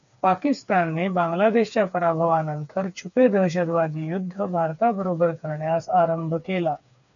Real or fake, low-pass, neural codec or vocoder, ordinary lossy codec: fake; 7.2 kHz; codec, 16 kHz, 4 kbps, FreqCodec, smaller model; MP3, 96 kbps